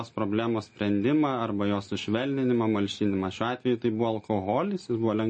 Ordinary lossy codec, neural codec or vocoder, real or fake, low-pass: MP3, 32 kbps; none; real; 10.8 kHz